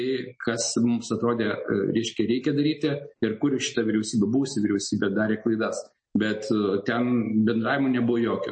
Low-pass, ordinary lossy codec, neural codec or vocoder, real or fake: 9.9 kHz; MP3, 32 kbps; none; real